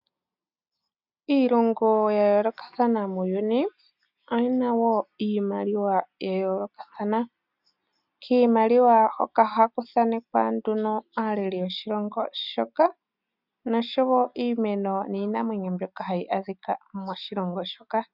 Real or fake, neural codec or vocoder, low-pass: real; none; 5.4 kHz